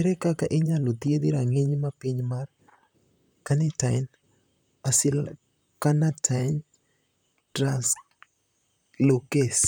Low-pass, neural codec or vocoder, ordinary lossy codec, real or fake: none; vocoder, 44.1 kHz, 128 mel bands, Pupu-Vocoder; none; fake